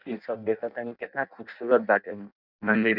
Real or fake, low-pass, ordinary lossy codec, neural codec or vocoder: fake; 5.4 kHz; none; codec, 16 kHz in and 24 kHz out, 0.6 kbps, FireRedTTS-2 codec